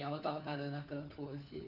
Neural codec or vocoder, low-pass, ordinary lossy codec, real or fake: codec, 16 kHz, 4 kbps, FunCodec, trained on LibriTTS, 50 frames a second; 5.4 kHz; Opus, 64 kbps; fake